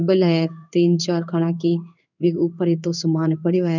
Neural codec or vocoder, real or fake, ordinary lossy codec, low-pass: codec, 16 kHz in and 24 kHz out, 1 kbps, XY-Tokenizer; fake; none; 7.2 kHz